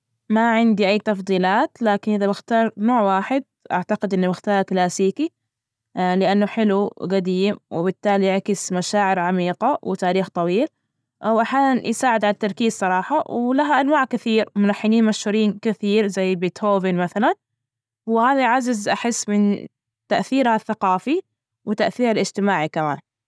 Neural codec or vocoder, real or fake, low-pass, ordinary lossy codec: none; real; none; none